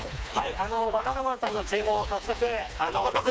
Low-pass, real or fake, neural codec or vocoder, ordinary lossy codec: none; fake; codec, 16 kHz, 2 kbps, FreqCodec, smaller model; none